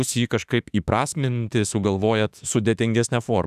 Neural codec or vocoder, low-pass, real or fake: autoencoder, 48 kHz, 32 numbers a frame, DAC-VAE, trained on Japanese speech; 14.4 kHz; fake